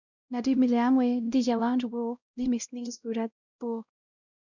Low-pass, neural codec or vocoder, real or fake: 7.2 kHz; codec, 16 kHz, 0.5 kbps, X-Codec, WavLM features, trained on Multilingual LibriSpeech; fake